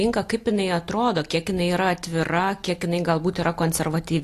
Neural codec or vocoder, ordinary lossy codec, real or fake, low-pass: none; AAC, 48 kbps; real; 14.4 kHz